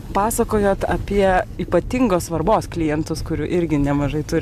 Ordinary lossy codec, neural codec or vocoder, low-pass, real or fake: MP3, 96 kbps; none; 14.4 kHz; real